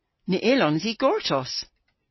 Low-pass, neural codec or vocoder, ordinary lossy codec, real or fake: 7.2 kHz; none; MP3, 24 kbps; real